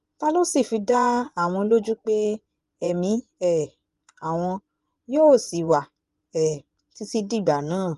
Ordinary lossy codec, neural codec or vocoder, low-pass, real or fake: Opus, 32 kbps; vocoder, 24 kHz, 100 mel bands, Vocos; 10.8 kHz; fake